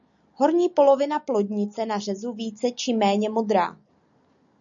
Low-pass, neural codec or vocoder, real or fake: 7.2 kHz; none; real